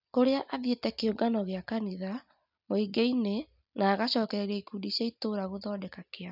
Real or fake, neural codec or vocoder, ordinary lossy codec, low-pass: real; none; none; 5.4 kHz